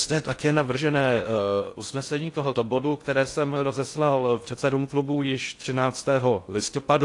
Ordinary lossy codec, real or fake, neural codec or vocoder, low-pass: AAC, 48 kbps; fake; codec, 16 kHz in and 24 kHz out, 0.6 kbps, FocalCodec, streaming, 4096 codes; 10.8 kHz